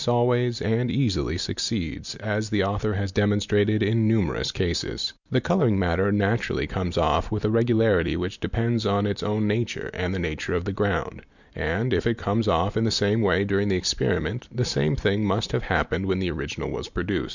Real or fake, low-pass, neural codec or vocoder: real; 7.2 kHz; none